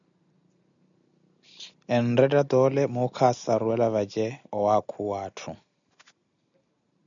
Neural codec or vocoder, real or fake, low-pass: none; real; 7.2 kHz